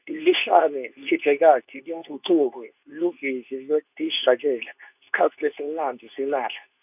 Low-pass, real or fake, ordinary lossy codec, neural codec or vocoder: 3.6 kHz; fake; none; codec, 24 kHz, 0.9 kbps, WavTokenizer, medium speech release version 2